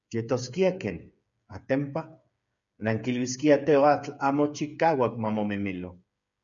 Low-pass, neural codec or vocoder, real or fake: 7.2 kHz; codec, 16 kHz, 8 kbps, FreqCodec, smaller model; fake